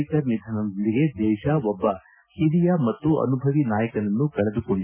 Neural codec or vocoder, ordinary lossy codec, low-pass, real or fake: none; none; 3.6 kHz; real